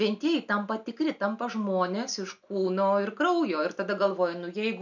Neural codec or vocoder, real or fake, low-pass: none; real; 7.2 kHz